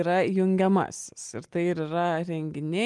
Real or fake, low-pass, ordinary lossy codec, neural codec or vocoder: real; 10.8 kHz; Opus, 32 kbps; none